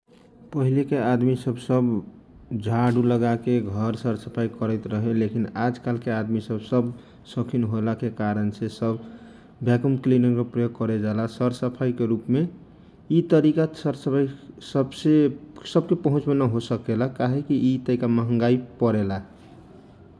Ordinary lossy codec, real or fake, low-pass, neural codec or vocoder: none; real; none; none